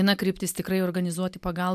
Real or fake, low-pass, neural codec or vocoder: real; 14.4 kHz; none